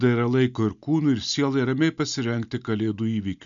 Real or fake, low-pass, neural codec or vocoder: real; 7.2 kHz; none